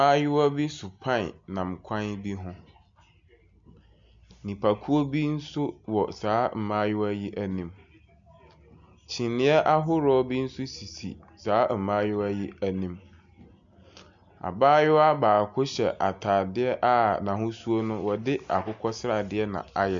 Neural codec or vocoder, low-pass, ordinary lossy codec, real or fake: none; 7.2 kHz; MP3, 64 kbps; real